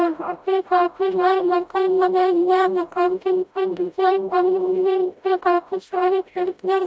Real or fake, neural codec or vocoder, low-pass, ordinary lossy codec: fake; codec, 16 kHz, 0.5 kbps, FreqCodec, smaller model; none; none